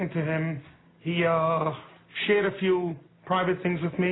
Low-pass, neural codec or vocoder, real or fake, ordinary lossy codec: 7.2 kHz; none; real; AAC, 16 kbps